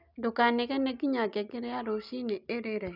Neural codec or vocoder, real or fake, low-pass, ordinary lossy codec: none; real; 5.4 kHz; none